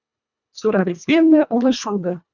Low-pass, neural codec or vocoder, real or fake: 7.2 kHz; codec, 24 kHz, 1.5 kbps, HILCodec; fake